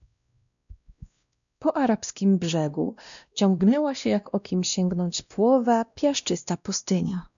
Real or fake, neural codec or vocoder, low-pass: fake; codec, 16 kHz, 1 kbps, X-Codec, WavLM features, trained on Multilingual LibriSpeech; 7.2 kHz